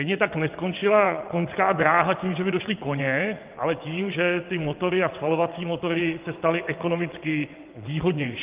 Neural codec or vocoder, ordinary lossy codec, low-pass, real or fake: vocoder, 22.05 kHz, 80 mel bands, WaveNeXt; Opus, 32 kbps; 3.6 kHz; fake